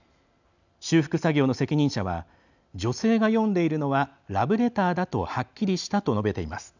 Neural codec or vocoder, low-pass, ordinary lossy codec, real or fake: none; 7.2 kHz; none; real